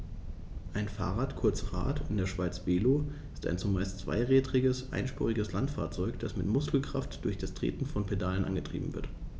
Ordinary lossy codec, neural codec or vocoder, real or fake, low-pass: none; none; real; none